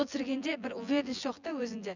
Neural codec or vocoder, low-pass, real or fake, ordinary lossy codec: vocoder, 24 kHz, 100 mel bands, Vocos; 7.2 kHz; fake; none